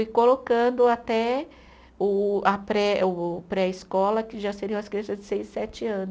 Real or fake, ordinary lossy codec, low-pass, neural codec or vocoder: real; none; none; none